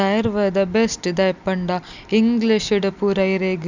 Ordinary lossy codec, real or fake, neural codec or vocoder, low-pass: none; real; none; 7.2 kHz